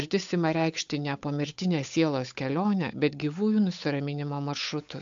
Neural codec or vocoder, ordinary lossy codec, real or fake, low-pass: codec, 16 kHz, 8 kbps, FunCodec, trained on Chinese and English, 25 frames a second; AAC, 64 kbps; fake; 7.2 kHz